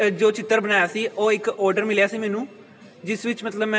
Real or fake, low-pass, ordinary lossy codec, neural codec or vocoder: real; none; none; none